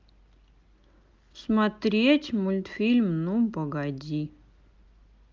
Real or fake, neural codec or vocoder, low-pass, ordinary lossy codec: real; none; 7.2 kHz; Opus, 24 kbps